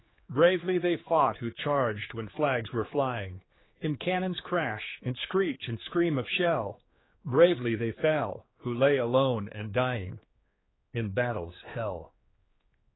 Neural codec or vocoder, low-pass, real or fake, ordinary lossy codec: codec, 16 kHz, 4 kbps, X-Codec, HuBERT features, trained on general audio; 7.2 kHz; fake; AAC, 16 kbps